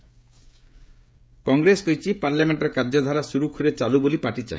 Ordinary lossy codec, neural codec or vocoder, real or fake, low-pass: none; codec, 16 kHz, 16 kbps, FreqCodec, smaller model; fake; none